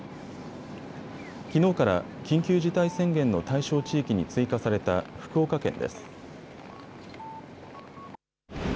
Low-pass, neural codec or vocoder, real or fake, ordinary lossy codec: none; none; real; none